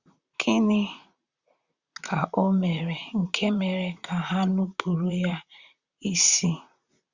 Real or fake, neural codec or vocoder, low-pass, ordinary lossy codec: fake; vocoder, 44.1 kHz, 128 mel bands, Pupu-Vocoder; 7.2 kHz; Opus, 64 kbps